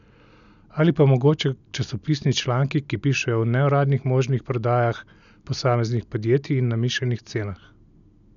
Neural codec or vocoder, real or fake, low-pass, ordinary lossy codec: none; real; 7.2 kHz; none